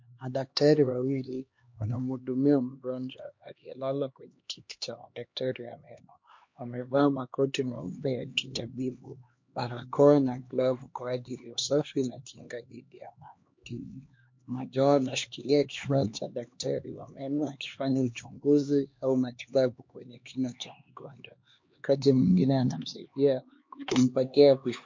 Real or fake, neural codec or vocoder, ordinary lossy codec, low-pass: fake; codec, 16 kHz, 2 kbps, X-Codec, HuBERT features, trained on LibriSpeech; MP3, 48 kbps; 7.2 kHz